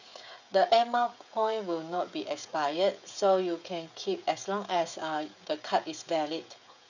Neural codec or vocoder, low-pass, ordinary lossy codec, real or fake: codec, 16 kHz, 16 kbps, FreqCodec, smaller model; 7.2 kHz; none; fake